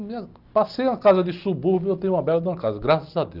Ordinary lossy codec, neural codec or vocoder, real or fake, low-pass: Opus, 32 kbps; none; real; 5.4 kHz